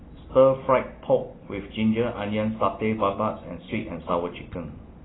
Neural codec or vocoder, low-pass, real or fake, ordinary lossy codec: none; 7.2 kHz; real; AAC, 16 kbps